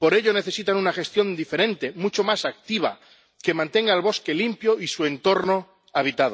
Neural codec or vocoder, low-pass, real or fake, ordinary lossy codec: none; none; real; none